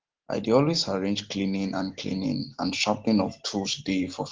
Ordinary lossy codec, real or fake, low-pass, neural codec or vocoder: Opus, 16 kbps; real; 7.2 kHz; none